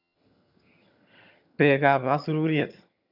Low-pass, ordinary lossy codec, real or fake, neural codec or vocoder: 5.4 kHz; none; fake; vocoder, 22.05 kHz, 80 mel bands, HiFi-GAN